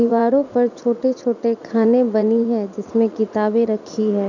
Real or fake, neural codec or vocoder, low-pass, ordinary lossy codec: fake; vocoder, 44.1 kHz, 80 mel bands, Vocos; 7.2 kHz; none